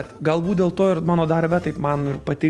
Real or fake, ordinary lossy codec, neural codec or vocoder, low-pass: real; Opus, 32 kbps; none; 10.8 kHz